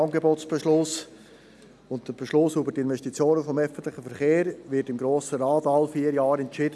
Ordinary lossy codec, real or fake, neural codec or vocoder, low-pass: none; real; none; none